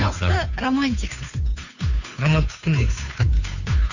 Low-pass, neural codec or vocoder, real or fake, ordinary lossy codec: 7.2 kHz; codec, 16 kHz, 2 kbps, FunCodec, trained on Chinese and English, 25 frames a second; fake; none